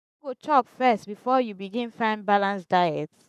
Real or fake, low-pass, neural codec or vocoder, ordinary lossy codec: fake; 14.4 kHz; codec, 44.1 kHz, 7.8 kbps, Pupu-Codec; none